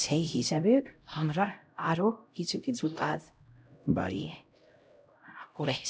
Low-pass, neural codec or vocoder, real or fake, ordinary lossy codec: none; codec, 16 kHz, 0.5 kbps, X-Codec, HuBERT features, trained on LibriSpeech; fake; none